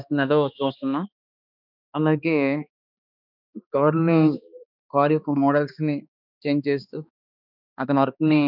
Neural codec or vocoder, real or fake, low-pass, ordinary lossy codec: codec, 16 kHz, 2 kbps, X-Codec, HuBERT features, trained on balanced general audio; fake; 5.4 kHz; none